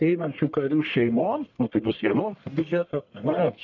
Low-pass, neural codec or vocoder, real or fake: 7.2 kHz; codec, 44.1 kHz, 1.7 kbps, Pupu-Codec; fake